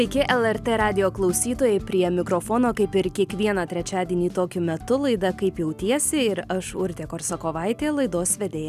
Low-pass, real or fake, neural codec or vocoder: 14.4 kHz; real; none